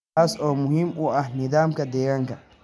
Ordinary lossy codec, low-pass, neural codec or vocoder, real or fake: none; none; none; real